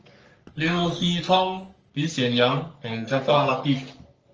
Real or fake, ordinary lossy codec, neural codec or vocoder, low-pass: fake; Opus, 24 kbps; codec, 44.1 kHz, 3.4 kbps, Pupu-Codec; 7.2 kHz